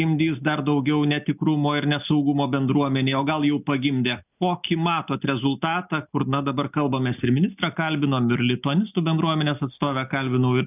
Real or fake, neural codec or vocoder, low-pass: real; none; 3.6 kHz